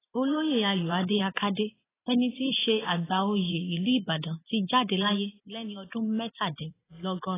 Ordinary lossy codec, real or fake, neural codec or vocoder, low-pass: AAC, 16 kbps; fake; vocoder, 44.1 kHz, 128 mel bands every 512 samples, BigVGAN v2; 3.6 kHz